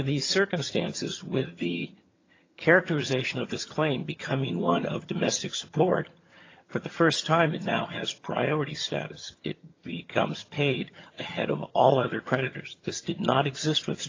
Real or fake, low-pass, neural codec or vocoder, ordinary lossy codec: fake; 7.2 kHz; vocoder, 22.05 kHz, 80 mel bands, HiFi-GAN; AAC, 32 kbps